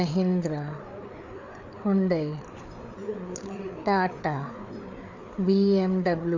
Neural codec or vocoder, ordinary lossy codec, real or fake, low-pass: codec, 16 kHz, 4 kbps, FreqCodec, larger model; none; fake; 7.2 kHz